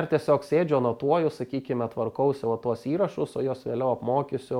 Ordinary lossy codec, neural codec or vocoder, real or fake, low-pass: MP3, 96 kbps; none; real; 19.8 kHz